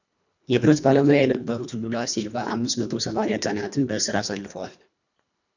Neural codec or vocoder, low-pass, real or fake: codec, 24 kHz, 1.5 kbps, HILCodec; 7.2 kHz; fake